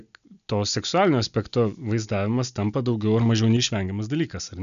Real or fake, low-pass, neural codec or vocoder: real; 7.2 kHz; none